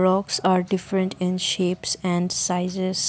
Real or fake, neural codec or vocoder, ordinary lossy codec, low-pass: real; none; none; none